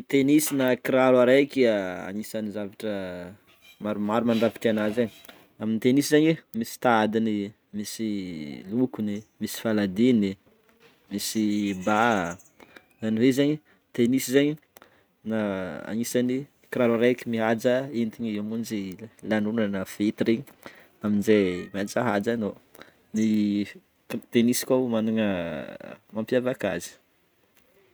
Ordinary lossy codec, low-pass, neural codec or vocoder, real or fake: none; none; none; real